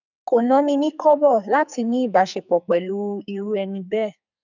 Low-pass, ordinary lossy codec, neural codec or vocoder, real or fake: 7.2 kHz; none; codec, 44.1 kHz, 2.6 kbps, SNAC; fake